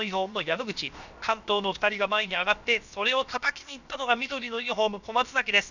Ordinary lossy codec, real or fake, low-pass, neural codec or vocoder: none; fake; 7.2 kHz; codec, 16 kHz, about 1 kbps, DyCAST, with the encoder's durations